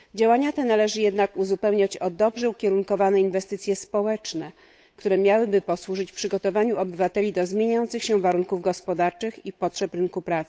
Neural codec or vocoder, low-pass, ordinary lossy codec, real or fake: codec, 16 kHz, 8 kbps, FunCodec, trained on Chinese and English, 25 frames a second; none; none; fake